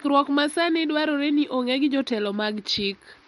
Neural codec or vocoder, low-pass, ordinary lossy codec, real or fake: none; 19.8 kHz; MP3, 48 kbps; real